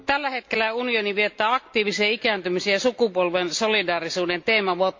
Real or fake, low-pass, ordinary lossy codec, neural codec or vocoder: real; 7.2 kHz; MP3, 48 kbps; none